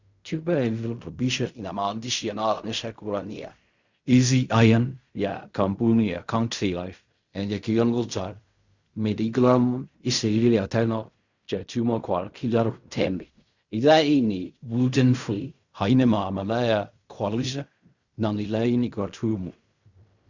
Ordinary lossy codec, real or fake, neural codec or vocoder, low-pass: Opus, 64 kbps; fake; codec, 16 kHz in and 24 kHz out, 0.4 kbps, LongCat-Audio-Codec, fine tuned four codebook decoder; 7.2 kHz